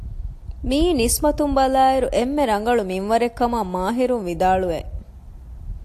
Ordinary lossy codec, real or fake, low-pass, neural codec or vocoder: AAC, 64 kbps; real; 14.4 kHz; none